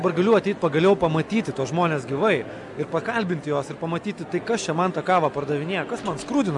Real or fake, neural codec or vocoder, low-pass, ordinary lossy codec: real; none; 10.8 kHz; MP3, 96 kbps